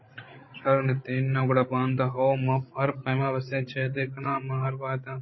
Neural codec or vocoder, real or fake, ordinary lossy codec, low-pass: codec, 16 kHz, 16 kbps, FreqCodec, larger model; fake; MP3, 24 kbps; 7.2 kHz